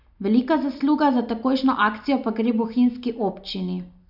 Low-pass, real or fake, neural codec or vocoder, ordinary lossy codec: 5.4 kHz; real; none; none